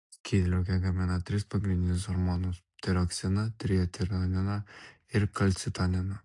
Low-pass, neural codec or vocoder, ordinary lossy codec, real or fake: 10.8 kHz; none; AAC, 64 kbps; real